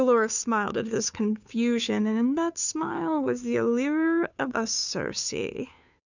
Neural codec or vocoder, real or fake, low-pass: codec, 16 kHz, 2 kbps, FunCodec, trained on Chinese and English, 25 frames a second; fake; 7.2 kHz